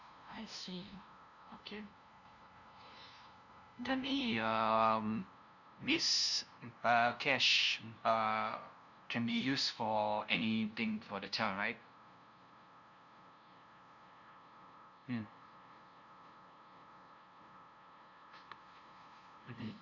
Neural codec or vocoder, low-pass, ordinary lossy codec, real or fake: codec, 16 kHz, 0.5 kbps, FunCodec, trained on LibriTTS, 25 frames a second; 7.2 kHz; none; fake